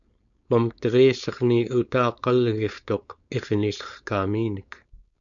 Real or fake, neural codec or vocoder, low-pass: fake; codec, 16 kHz, 4.8 kbps, FACodec; 7.2 kHz